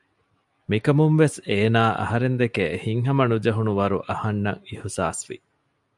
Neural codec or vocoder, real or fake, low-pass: none; real; 10.8 kHz